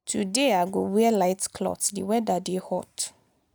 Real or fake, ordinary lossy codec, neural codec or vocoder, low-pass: real; none; none; none